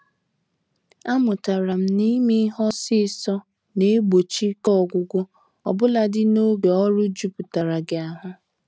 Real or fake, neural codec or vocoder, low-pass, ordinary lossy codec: real; none; none; none